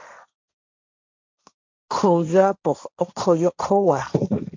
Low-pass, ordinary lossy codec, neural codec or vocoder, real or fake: 7.2 kHz; MP3, 64 kbps; codec, 16 kHz, 1.1 kbps, Voila-Tokenizer; fake